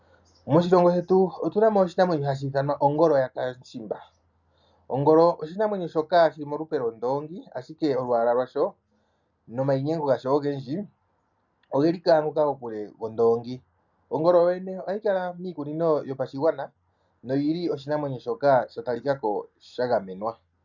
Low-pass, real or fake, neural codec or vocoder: 7.2 kHz; real; none